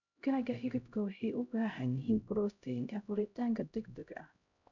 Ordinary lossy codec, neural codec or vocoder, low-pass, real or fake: none; codec, 16 kHz, 0.5 kbps, X-Codec, HuBERT features, trained on LibriSpeech; 7.2 kHz; fake